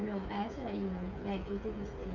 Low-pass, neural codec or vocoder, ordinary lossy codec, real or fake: 7.2 kHz; codec, 16 kHz, 8 kbps, FreqCodec, smaller model; none; fake